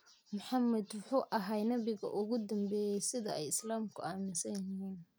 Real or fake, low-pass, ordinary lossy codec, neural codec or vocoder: real; none; none; none